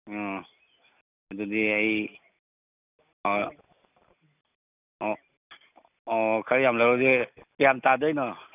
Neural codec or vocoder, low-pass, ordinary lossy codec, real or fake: none; 3.6 kHz; none; real